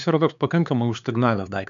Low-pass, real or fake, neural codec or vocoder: 7.2 kHz; fake; codec, 16 kHz, 4 kbps, X-Codec, HuBERT features, trained on LibriSpeech